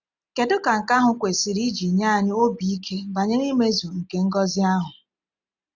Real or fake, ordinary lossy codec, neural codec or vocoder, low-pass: real; none; none; 7.2 kHz